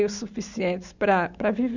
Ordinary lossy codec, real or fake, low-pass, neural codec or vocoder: none; real; 7.2 kHz; none